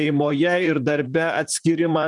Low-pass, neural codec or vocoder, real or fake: 10.8 kHz; vocoder, 44.1 kHz, 128 mel bands, Pupu-Vocoder; fake